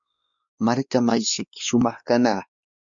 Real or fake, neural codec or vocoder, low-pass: fake; codec, 16 kHz, 2 kbps, X-Codec, WavLM features, trained on Multilingual LibriSpeech; 7.2 kHz